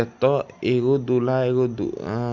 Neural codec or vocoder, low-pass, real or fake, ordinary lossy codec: none; 7.2 kHz; real; none